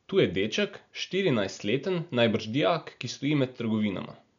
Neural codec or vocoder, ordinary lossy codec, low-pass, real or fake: none; none; 7.2 kHz; real